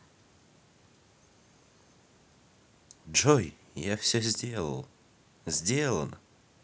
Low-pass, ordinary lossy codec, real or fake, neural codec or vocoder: none; none; real; none